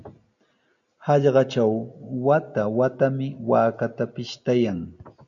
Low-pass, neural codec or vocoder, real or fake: 7.2 kHz; none; real